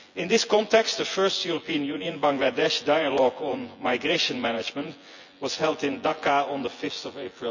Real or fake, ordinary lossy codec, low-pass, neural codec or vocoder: fake; none; 7.2 kHz; vocoder, 24 kHz, 100 mel bands, Vocos